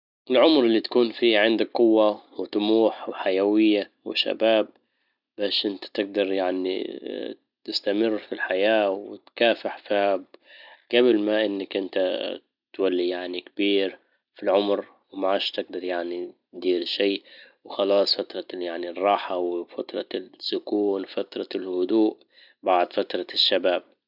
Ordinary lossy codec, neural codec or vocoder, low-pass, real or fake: none; none; 5.4 kHz; real